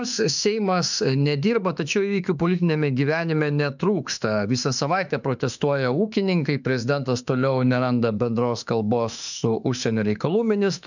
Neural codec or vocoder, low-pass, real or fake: autoencoder, 48 kHz, 32 numbers a frame, DAC-VAE, trained on Japanese speech; 7.2 kHz; fake